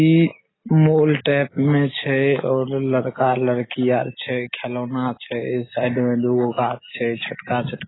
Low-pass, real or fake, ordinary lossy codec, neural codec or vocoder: 7.2 kHz; real; AAC, 16 kbps; none